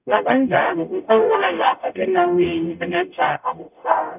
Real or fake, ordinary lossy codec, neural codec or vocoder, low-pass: fake; none; codec, 44.1 kHz, 0.9 kbps, DAC; 3.6 kHz